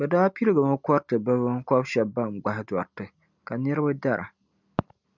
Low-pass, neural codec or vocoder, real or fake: 7.2 kHz; none; real